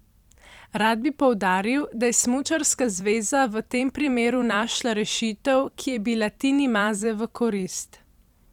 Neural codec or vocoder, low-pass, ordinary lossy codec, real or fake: vocoder, 44.1 kHz, 128 mel bands every 256 samples, BigVGAN v2; 19.8 kHz; none; fake